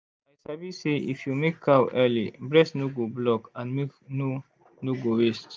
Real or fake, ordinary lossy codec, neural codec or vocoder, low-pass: real; none; none; none